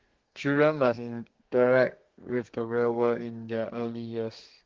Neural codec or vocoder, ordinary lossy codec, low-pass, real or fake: codec, 32 kHz, 1.9 kbps, SNAC; Opus, 16 kbps; 7.2 kHz; fake